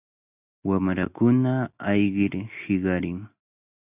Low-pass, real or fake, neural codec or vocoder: 3.6 kHz; real; none